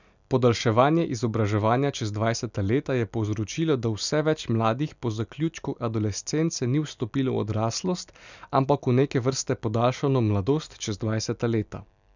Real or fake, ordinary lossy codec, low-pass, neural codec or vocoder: real; none; 7.2 kHz; none